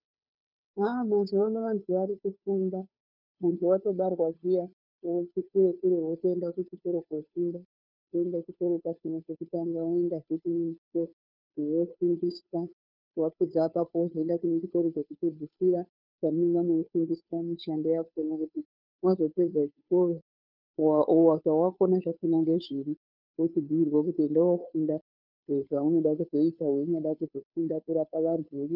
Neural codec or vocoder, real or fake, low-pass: codec, 16 kHz, 2 kbps, FunCodec, trained on Chinese and English, 25 frames a second; fake; 5.4 kHz